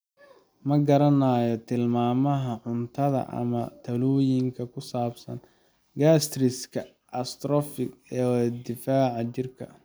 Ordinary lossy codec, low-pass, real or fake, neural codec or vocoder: none; none; real; none